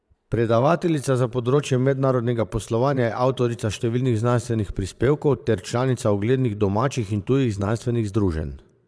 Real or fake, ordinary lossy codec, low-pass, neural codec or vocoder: fake; none; none; vocoder, 22.05 kHz, 80 mel bands, Vocos